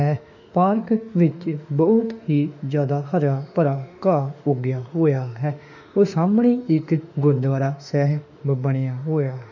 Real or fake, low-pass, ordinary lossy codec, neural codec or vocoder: fake; 7.2 kHz; none; autoencoder, 48 kHz, 32 numbers a frame, DAC-VAE, trained on Japanese speech